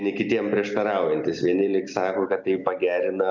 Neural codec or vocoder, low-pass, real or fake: none; 7.2 kHz; real